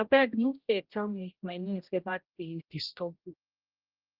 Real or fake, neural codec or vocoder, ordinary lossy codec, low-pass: fake; codec, 16 kHz, 0.5 kbps, X-Codec, HuBERT features, trained on general audio; Opus, 32 kbps; 5.4 kHz